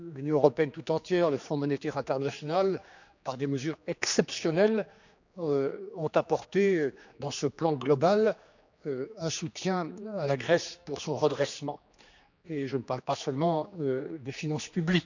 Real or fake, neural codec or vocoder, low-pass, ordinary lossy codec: fake; codec, 16 kHz, 2 kbps, X-Codec, HuBERT features, trained on general audio; 7.2 kHz; none